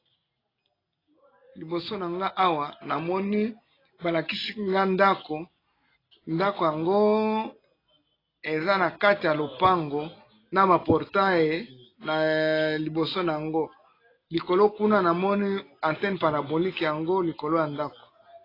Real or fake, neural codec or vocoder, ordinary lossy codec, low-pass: real; none; AAC, 24 kbps; 5.4 kHz